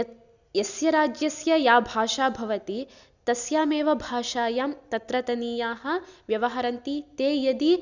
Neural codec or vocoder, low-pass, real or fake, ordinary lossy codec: none; 7.2 kHz; real; none